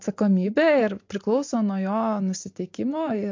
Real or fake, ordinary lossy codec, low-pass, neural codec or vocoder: real; MP3, 48 kbps; 7.2 kHz; none